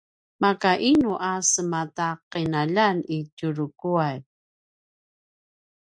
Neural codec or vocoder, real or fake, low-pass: none; real; 9.9 kHz